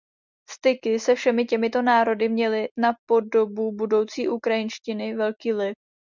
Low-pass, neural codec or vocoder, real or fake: 7.2 kHz; none; real